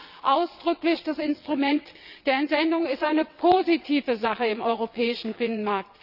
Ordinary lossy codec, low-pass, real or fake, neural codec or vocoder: none; 5.4 kHz; fake; vocoder, 22.05 kHz, 80 mel bands, WaveNeXt